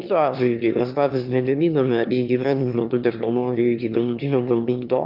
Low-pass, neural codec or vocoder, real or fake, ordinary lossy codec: 5.4 kHz; autoencoder, 22.05 kHz, a latent of 192 numbers a frame, VITS, trained on one speaker; fake; Opus, 24 kbps